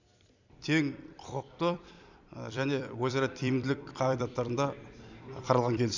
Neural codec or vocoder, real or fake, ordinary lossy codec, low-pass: vocoder, 44.1 kHz, 128 mel bands every 256 samples, BigVGAN v2; fake; none; 7.2 kHz